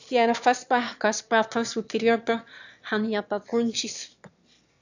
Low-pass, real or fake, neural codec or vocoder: 7.2 kHz; fake; autoencoder, 22.05 kHz, a latent of 192 numbers a frame, VITS, trained on one speaker